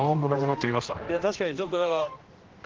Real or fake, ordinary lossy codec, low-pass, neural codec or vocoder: fake; Opus, 16 kbps; 7.2 kHz; codec, 16 kHz, 1 kbps, X-Codec, HuBERT features, trained on general audio